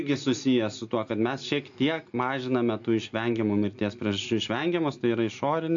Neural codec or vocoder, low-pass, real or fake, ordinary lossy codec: none; 7.2 kHz; real; AAC, 64 kbps